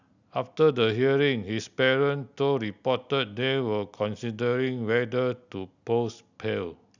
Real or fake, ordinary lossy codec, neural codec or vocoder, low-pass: real; none; none; 7.2 kHz